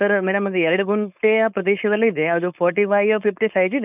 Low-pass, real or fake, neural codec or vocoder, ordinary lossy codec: 3.6 kHz; fake; codec, 16 kHz, 4.8 kbps, FACodec; none